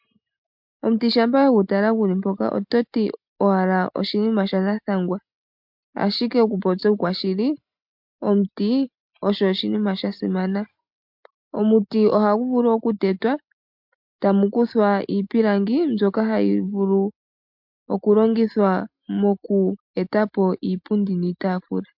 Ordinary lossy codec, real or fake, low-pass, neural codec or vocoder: MP3, 48 kbps; real; 5.4 kHz; none